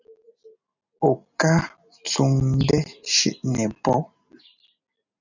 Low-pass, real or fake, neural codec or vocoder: 7.2 kHz; real; none